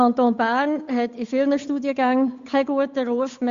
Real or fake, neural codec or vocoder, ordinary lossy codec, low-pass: fake; codec, 16 kHz, 8 kbps, FunCodec, trained on Chinese and English, 25 frames a second; Opus, 64 kbps; 7.2 kHz